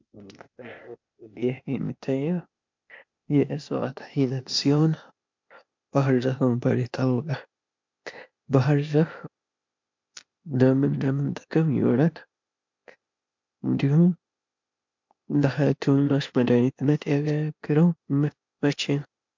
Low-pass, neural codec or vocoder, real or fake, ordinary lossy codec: 7.2 kHz; codec, 16 kHz, 0.8 kbps, ZipCodec; fake; MP3, 64 kbps